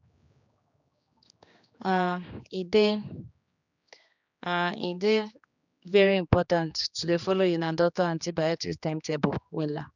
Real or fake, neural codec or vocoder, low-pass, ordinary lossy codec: fake; codec, 16 kHz, 2 kbps, X-Codec, HuBERT features, trained on general audio; 7.2 kHz; none